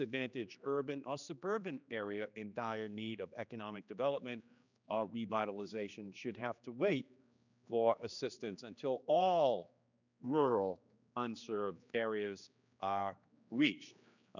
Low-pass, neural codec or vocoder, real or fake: 7.2 kHz; codec, 16 kHz, 2 kbps, X-Codec, HuBERT features, trained on general audio; fake